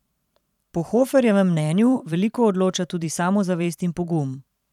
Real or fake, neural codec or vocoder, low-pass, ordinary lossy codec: fake; vocoder, 44.1 kHz, 128 mel bands every 512 samples, BigVGAN v2; 19.8 kHz; none